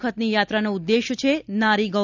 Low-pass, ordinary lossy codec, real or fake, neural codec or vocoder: 7.2 kHz; none; real; none